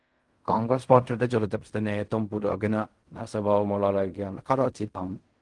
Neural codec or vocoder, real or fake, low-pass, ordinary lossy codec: codec, 16 kHz in and 24 kHz out, 0.4 kbps, LongCat-Audio-Codec, fine tuned four codebook decoder; fake; 10.8 kHz; Opus, 24 kbps